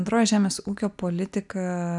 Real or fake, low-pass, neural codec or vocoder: real; 10.8 kHz; none